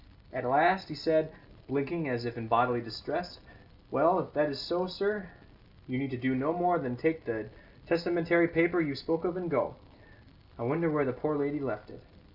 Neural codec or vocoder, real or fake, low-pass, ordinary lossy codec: none; real; 5.4 kHz; Opus, 64 kbps